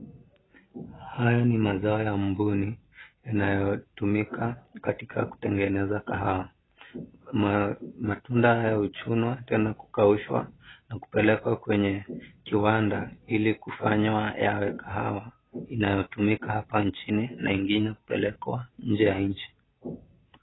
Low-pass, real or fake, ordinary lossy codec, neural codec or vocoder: 7.2 kHz; real; AAC, 16 kbps; none